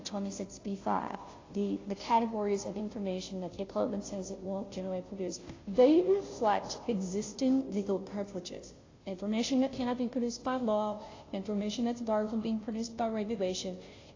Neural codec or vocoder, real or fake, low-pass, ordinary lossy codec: codec, 16 kHz, 0.5 kbps, FunCodec, trained on Chinese and English, 25 frames a second; fake; 7.2 kHz; AAC, 32 kbps